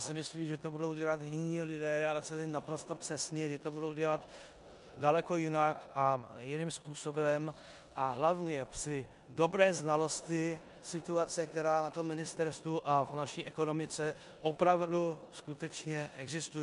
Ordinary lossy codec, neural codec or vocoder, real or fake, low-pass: MP3, 64 kbps; codec, 16 kHz in and 24 kHz out, 0.9 kbps, LongCat-Audio-Codec, four codebook decoder; fake; 10.8 kHz